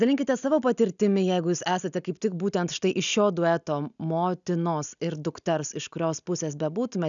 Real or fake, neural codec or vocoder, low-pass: real; none; 7.2 kHz